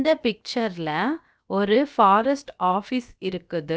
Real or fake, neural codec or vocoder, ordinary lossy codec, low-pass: fake; codec, 16 kHz, about 1 kbps, DyCAST, with the encoder's durations; none; none